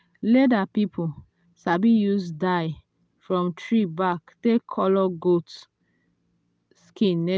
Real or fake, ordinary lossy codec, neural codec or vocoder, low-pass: real; none; none; none